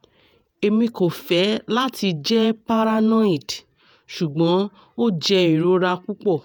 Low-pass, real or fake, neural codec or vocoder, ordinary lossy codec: none; fake; vocoder, 48 kHz, 128 mel bands, Vocos; none